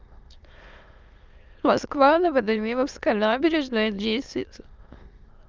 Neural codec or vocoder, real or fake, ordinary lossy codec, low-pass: autoencoder, 22.05 kHz, a latent of 192 numbers a frame, VITS, trained on many speakers; fake; Opus, 24 kbps; 7.2 kHz